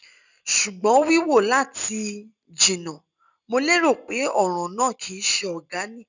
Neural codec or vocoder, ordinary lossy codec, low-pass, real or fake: none; none; 7.2 kHz; real